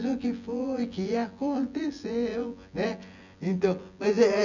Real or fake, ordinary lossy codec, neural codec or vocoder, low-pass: fake; none; vocoder, 24 kHz, 100 mel bands, Vocos; 7.2 kHz